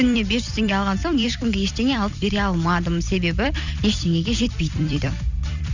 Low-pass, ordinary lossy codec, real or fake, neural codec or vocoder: 7.2 kHz; none; fake; vocoder, 44.1 kHz, 128 mel bands every 256 samples, BigVGAN v2